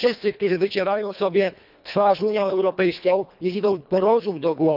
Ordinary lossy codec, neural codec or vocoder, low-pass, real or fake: none; codec, 24 kHz, 1.5 kbps, HILCodec; 5.4 kHz; fake